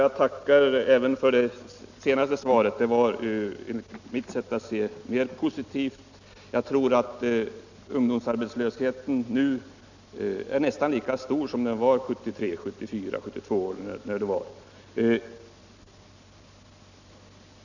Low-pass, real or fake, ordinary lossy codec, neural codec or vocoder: 7.2 kHz; real; none; none